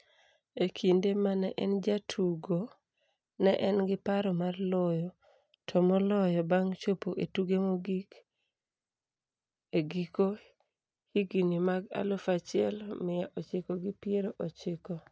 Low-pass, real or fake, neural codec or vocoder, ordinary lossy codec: none; real; none; none